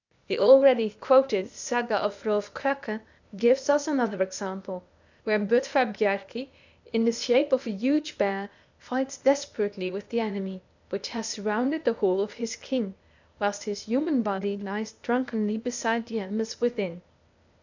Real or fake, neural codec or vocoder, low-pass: fake; codec, 16 kHz, 0.8 kbps, ZipCodec; 7.2 kHz